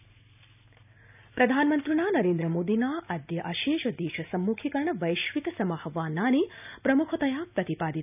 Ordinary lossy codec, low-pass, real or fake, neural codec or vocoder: none; 3.6 kHz; real; none